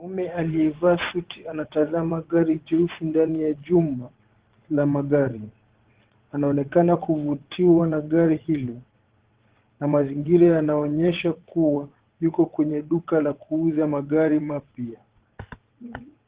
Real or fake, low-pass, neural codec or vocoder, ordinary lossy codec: fake; 3.6 kHz; vocoder, 22.05 kHz, 80 mel bands, WaveNeXt; Opus, 16 kbps